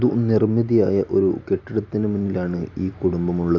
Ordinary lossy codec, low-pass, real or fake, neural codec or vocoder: AAC, 48 kbps; 7.2 kHz; real; none